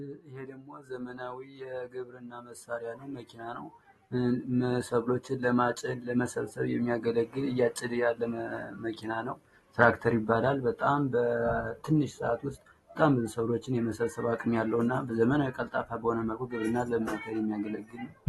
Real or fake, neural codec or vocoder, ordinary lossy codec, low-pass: real; none; AAC, 32 kbps; 19.8 kHz